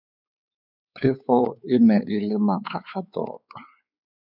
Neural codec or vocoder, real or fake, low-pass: codec, 16 kHz, 4 kbps, X-Codec, WavLM features, trained on Multilingual LibriSpeech; fake; 5.4 kHz